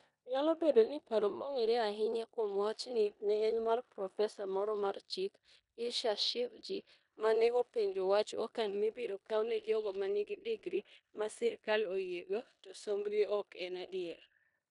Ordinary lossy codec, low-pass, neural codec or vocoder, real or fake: none; 10.8 kHz; codec, 16 kHz in and 24 kHz out, 0.9 kbps, LongCat-Audio-Codec, four codebook decoder; fake